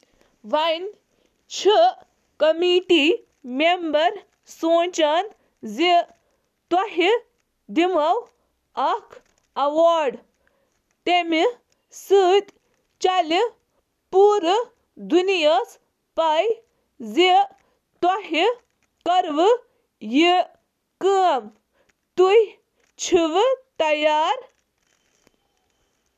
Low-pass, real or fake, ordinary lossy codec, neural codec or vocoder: 14.4 kHz; real; none; none